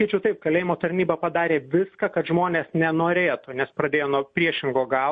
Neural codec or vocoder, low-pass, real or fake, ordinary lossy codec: none; 9.9 kHz; real; MP3, 48 kbps